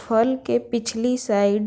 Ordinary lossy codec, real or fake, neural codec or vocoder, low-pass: none; real; none; none